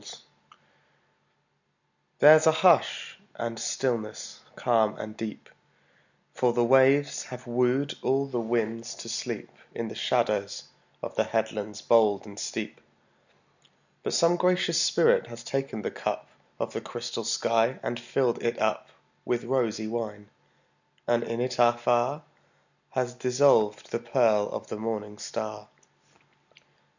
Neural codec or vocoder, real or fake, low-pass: none; real; 7.2 kHz